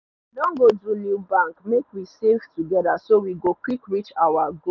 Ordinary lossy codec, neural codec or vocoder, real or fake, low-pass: none; none; real; 7.2 kHz